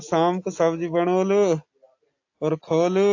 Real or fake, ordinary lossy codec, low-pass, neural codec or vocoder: real; AAC, 48 kbps; 7.2 kHz; none